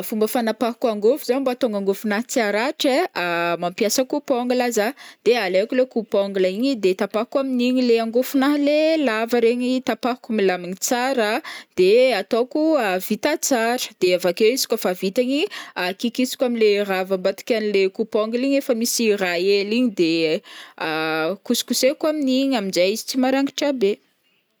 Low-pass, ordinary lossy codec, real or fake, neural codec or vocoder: none; none; real; none